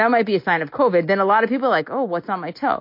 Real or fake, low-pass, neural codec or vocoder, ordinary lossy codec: real; 5.4 kHz; none; MP3, 32 kbps